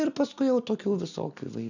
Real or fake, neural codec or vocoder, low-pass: real; none; 7.2 kHz